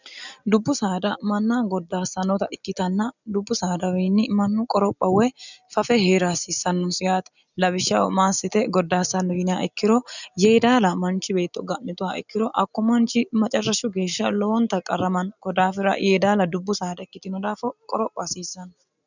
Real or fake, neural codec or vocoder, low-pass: real; none; 7.2 kHz